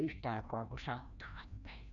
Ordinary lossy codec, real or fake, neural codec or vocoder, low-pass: none; fake; codec, 16 kHz, 1 kbps, FreqCodec, larger model; 7.2 kHz